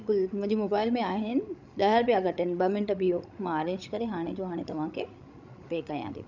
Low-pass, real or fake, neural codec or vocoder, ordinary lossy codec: 7.2 kHz; fake; codec, 16 kHz, 16 kbps, FreqCodec, larger model; none